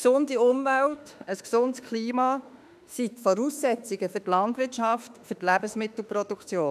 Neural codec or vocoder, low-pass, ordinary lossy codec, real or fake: autoencoder, 48 kHz, 32 numbers a frame, DAC-VAE, trained on Japanese speech; 14.4 kHz; none; fake